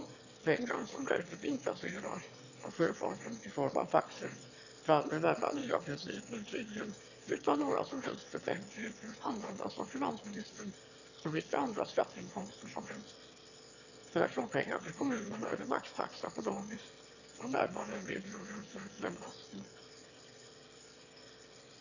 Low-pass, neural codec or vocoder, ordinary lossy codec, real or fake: 7.2 kHz; autoencoder, 22.05 kHz, a latent of 192 numbers a frame, VITS, trained on one speaker; none; fake